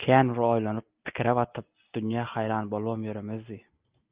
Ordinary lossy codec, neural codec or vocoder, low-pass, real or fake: Opus, 24 kbps; none; 3.6 kHz; real